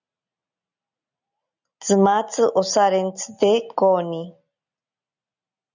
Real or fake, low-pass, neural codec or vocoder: real; 7.2 kHz; none